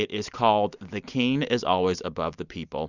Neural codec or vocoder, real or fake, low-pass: none; real; 7.2 kHz